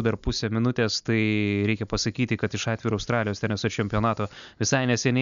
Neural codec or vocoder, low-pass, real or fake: none; 7.2 kHz; real